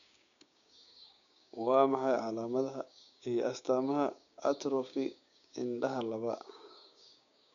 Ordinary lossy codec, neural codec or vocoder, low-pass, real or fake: none; codec, 16 kHz, 6 kbps, DAC; 7.2 kHz; fake